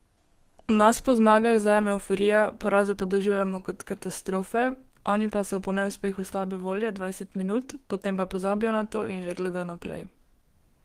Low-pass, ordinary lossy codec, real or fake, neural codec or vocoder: 14.4 kHz; Opus, 24 kbps; fake; codec, 32 kHz, 1.9 kbps, SNAC